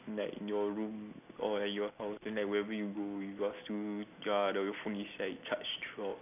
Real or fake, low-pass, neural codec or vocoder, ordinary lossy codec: real; 3.6 kHz; none; none